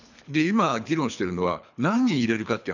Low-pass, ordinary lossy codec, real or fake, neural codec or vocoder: 7.2 kHz; none; fake; codec, 24 kHz, 3 kbps, HILCodec